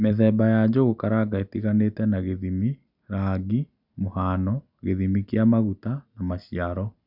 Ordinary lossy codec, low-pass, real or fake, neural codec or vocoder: none; 5.4 kHz; real; none